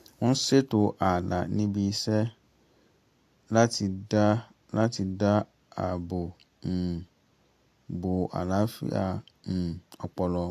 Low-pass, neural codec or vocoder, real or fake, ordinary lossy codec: 14.4 kHz; none; real; AAC, 64 kbps